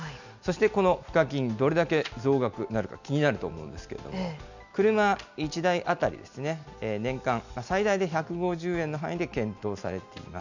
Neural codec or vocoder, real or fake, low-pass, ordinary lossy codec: none; real; 7.2 kHz; none